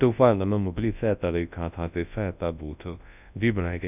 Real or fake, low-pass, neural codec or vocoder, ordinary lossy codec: fake; 3.6 kHz; codec, 24 kHz, 0.9 kbps, WavTokenizer, large speech release; none